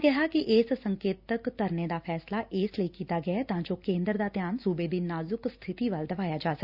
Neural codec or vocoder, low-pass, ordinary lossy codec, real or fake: none; 5.4 kHz; Opus, 64 kbps; real